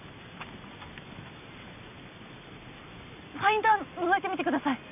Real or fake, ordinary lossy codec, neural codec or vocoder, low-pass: real; none; none; 3.6 kHz